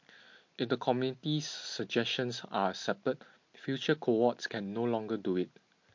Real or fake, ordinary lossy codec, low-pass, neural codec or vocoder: real; MP3, 48 kbps; 7.2 kHz; none